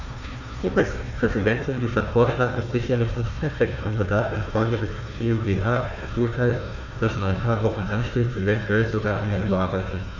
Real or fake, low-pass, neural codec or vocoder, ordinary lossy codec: fake; 7.2 kHz; codec, 16 kHz, 1 kbps, FunCodec, trained on Chinese and English, 50 frames a second; none